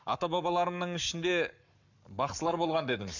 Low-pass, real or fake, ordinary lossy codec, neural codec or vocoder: 7.2 kHz; fake; none; codec, 44.1 kHz, 7.8 kbps, Pupu-Codec